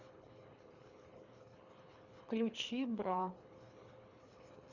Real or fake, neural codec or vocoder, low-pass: fake; codec, 24 kHz, 3 kbps, HILCodec; 7.2 kHz